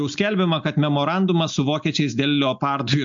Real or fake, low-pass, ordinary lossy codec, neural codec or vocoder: real; 7.2 kHz; MP3, 64 kbps; none